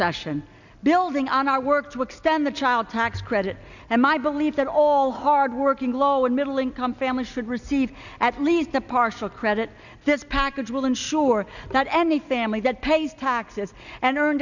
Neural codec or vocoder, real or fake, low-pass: none; real; 7.2 kHz